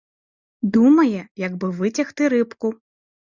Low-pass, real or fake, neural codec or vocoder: 7.2 kHz; real; none